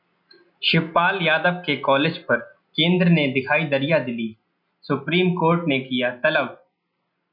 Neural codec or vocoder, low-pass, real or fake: none; 5.4 kHz; real